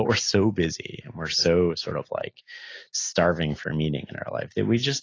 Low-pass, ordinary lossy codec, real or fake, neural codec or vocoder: 7.2 kHz; AAC, 32 kbps; real; none